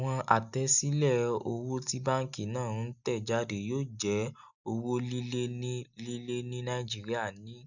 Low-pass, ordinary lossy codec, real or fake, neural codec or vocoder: 7.2 kHz; none; real; none